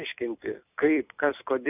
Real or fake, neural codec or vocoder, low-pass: fake; vocoder, 44.1 kHz, 128 mel bands every 256 samples, BigVGAN v2; 3.6 kHz